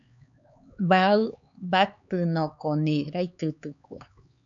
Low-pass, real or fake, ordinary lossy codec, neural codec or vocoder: 7.2 kHz; fake; MP3, 96 kbps; codec, 16 kHz, 4 kbps, X-Codec, HuBERT features, trained on LibriSpeech